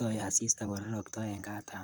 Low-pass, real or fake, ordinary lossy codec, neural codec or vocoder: none; fake; none; vocoder, 44.1 kHz, 128 mel bands, Pupu-Vocoder